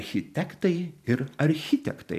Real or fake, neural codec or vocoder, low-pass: fake; vocoder, 44.1 kHz, 128 mel bands every 512 samples, BigVGAN v2; 14.4 kHz